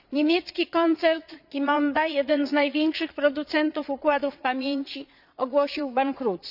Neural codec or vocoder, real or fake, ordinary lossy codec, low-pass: vocoder, 22.05 kHz, 80 mel bands, Vocos; fake; none; 5.4 kHz